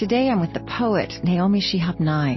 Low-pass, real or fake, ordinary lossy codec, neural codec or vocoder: 7.2 kHz; real; MP3, 24 kbps; none